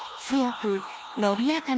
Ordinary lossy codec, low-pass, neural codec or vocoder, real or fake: none; none; codec, 16 kHz, 1 kbps, FunCodec, trained on LibriTTS, 50 frames a second; fake